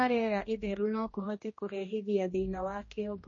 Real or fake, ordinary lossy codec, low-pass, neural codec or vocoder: fake; MP3, 32 kbps; 7.2 kHz; codec, 16 kHz, 1 kbps, X-Codec, HuBERT features, trained on general audio